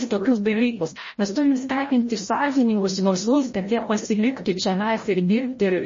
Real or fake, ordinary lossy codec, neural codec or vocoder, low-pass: fake; MP3, 32 kbps; codec, 16 kHz, 0.5 kbps, FreqCodec, larger model; 7.2 kHz